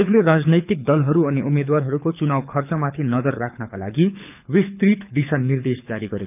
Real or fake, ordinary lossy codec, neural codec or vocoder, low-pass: fake; none; codec, 24 kHz, 6 kbps, HILCodec; 3.6 kHz